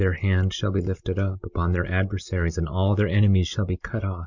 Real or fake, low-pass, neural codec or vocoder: real; 7.2 kHz; none